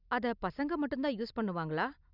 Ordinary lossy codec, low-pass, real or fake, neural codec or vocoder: none; 5.4 kHz; real; none